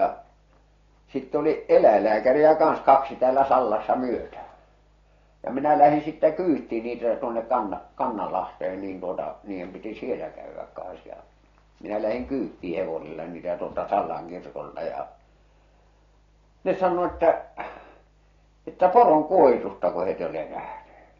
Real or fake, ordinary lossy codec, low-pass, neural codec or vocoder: real; AAC, 32 kbps; 7.2 kHz; none